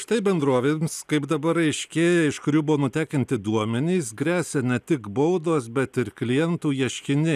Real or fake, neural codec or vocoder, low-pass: real; none; 14.4 kHz